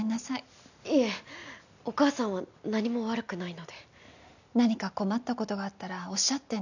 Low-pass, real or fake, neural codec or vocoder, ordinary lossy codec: 7.2 kHz; real; none; none